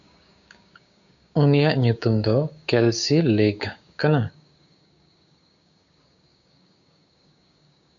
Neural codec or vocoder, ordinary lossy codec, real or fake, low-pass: codec, 16 kHz, 6 kbps, DAC; MP3, 96 kbps; fake; 7.2 kHz